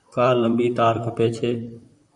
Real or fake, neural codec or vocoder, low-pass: fake; vocoder, 44.1 kHz, 128 mel bands, Pupu-Vocoder; 10.8 kHz